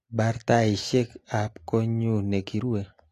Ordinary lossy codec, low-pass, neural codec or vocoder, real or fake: AAC, 48 kbps; 14.4 kHz; none; real